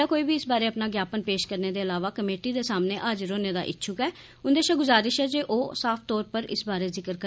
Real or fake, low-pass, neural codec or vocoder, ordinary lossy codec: real; none; none; none